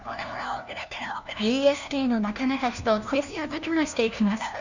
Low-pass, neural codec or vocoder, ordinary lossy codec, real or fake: 7.2 kHz; codec, 16 kHz, 1 kbps, FunCodec, trained on LibriTTS, 50 frames a second; none; fake